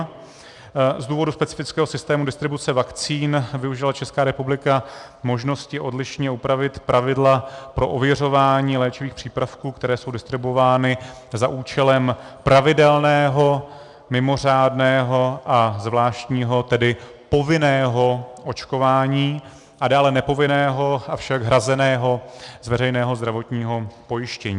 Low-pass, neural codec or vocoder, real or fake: 10.8 kHz; none; real